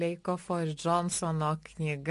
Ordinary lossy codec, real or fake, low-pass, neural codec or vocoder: MP3, 48 kbps; real; 14.4 kHz; none